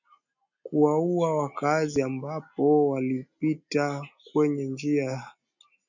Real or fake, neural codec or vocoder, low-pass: real; none; 7.2 kHz